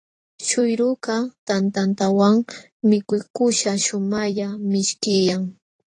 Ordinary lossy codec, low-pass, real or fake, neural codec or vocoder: AAC, 32 kbps; 10.8 kHz; fake; vocoder, 44.1 kHz, 128 mel bands every 256 samples, BigVGAN v2